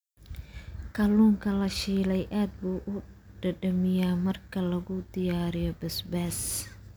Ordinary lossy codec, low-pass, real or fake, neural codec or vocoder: none; none; real; none